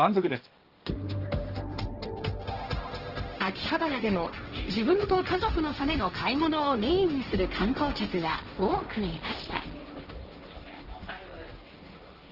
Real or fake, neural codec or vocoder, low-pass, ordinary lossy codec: fake; codec, 16 kHz, 1.1 kbps, Voila-Tokenizer; 5.4 kHz; Opus, 16 kbps